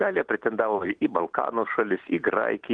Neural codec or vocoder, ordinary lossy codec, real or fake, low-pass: none; Opus, 24 kbps; real; 9.9 kHz